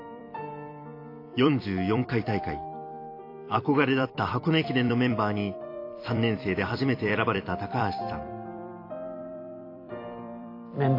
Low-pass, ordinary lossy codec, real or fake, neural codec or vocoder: 5.4 kHz; AAC, 32 kbps; fake; vocoder, 44.1 kHz, 128 mel bands every 256 samples, BigVGAN v2